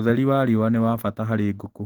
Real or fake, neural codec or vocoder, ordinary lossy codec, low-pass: real; none; Opus, 16 kbps; 19.8 kHz